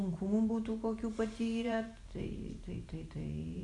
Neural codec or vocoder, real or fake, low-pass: vocoder, 44.1 kHz, 128 mel bands every 512 samples, BigVGAN v2; fake; 10.8 kHz